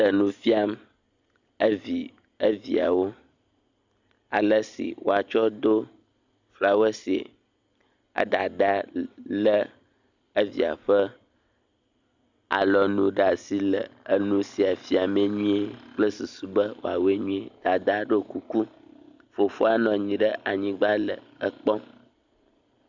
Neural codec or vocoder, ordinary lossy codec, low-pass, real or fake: none; Opus, 64 kbps; 7.2 kHz; real